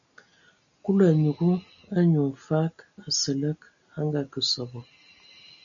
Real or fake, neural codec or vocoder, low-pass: real; none; 7.2 kHz